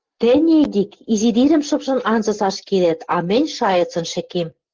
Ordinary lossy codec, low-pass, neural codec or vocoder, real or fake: Opus, 16 kbps; 7.2 kHz; none; real